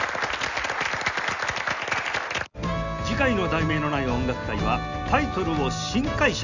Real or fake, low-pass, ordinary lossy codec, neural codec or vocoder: real; 7.2 kHz; none; none